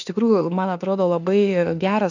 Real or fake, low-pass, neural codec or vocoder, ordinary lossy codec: fake; 7.2 kHz; autoencoder, 48 kHz, 32 numbers a frame, DAC-VAE, trained on Japanese speech; AAC, 48 kbps